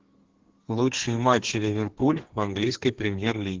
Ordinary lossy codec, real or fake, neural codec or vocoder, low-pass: Opus, 16 kbps; fake; codec, 32 kHz, 1.9 kbps, SNAC; 7.2 kHz